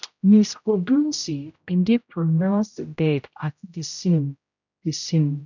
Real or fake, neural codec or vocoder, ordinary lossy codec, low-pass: fake; codec, 16 kHz, 0.5 kbps, X-Codec, HuBERT features, trained on general audio; none; 7.2 kHz